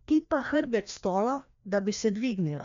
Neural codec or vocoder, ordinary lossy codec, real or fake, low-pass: codec, 16 kHz, 1 kbps, FreqCodec, larger model; MP3, 64 kbps; fake; 7.2 kHz